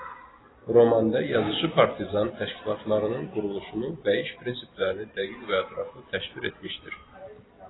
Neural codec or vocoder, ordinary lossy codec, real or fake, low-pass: none; AAC, 16 kbps; real; 7.2 kHz